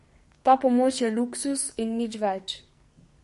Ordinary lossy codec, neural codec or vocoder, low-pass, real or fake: MP3, 48 kbps; codec, 44.1 kHz, 2.6 kbps, SNAC; 14.4 kHz; fake